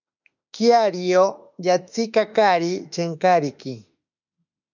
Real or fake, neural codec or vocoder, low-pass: fake; autoencoder, 48 kHz, 32 numbers a frame, DAC-VAE, trained on Japanese speech; 7.2 kHz